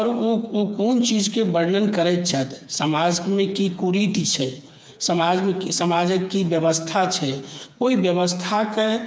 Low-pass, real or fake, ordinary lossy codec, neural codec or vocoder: none; fake; none; codec, 16 kHz, 4 kbps, FreqCodec, smaller model